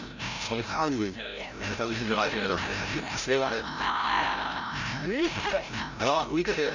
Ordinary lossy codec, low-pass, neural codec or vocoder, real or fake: none; 7.2 kHz; codec, 16 kHz, 0.5 kbps, FreqCodec, larger model; fake